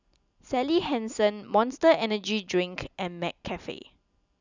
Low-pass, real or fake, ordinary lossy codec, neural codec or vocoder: 7.2 kHz; real; none; none